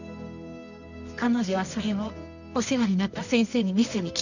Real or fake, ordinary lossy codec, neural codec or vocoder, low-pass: fake; none; codec, 24 kHz, 0.9 kbps, WavTokenizer, medium music audio release; 7.2 kHz